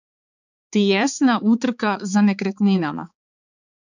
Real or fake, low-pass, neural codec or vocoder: fake; 7.2 kHz; codec, 16 kHz, 4 kbps, X-Codec, HuBERT features, trained on balanced general audio